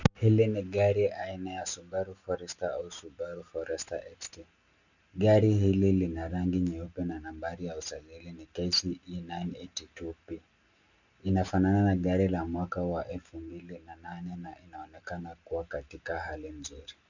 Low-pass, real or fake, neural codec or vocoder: 7.2 kHz; real; none